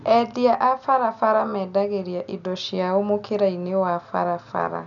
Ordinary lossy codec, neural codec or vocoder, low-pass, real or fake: none; none; 7.2 kHz; real